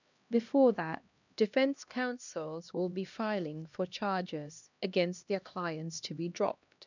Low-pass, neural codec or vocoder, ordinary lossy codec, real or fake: 7.2 kHz; codec, 16 kHz, 1 kbps, X-Codec, HuBERT features, trained on LibriSpeech; none; fake